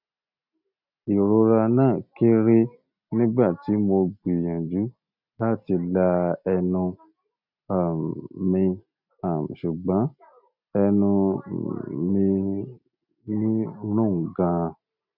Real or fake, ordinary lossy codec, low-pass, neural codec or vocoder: real; none; 5.4 kHz; none